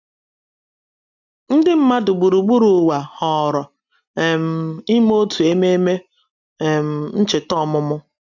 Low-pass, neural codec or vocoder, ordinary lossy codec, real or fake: 7.2 kHz; none; none; real